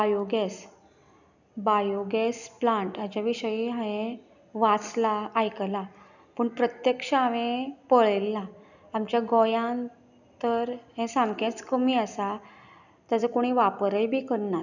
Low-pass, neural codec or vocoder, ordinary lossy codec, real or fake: 7.2 kHz; none; none; real